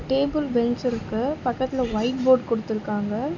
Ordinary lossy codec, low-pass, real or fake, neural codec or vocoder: none; 7.2 kHz; real; none